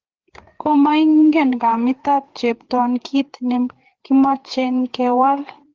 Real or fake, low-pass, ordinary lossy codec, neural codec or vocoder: fake; 7.2 kHz; Opus, 16 kbps; codec, 16 kHz, 4 kbps, FreqCodec, larger model